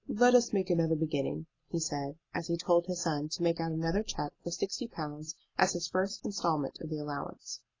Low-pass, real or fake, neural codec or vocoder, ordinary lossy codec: 7.2 kHz; real; none; AAC, 32 kbps